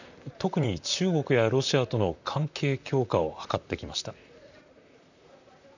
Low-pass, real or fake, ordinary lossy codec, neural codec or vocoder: 7.2 kHz; fake; none; vocoder, 44.1 kHz, 128 mel bands, Pupu-Vocoder